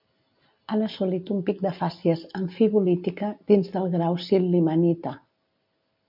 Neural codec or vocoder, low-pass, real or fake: none; 5.4 kHz; real